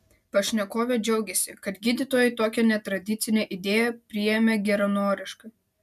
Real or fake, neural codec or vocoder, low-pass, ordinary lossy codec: real; none; 14.4 kHz; MP3, 96 kbps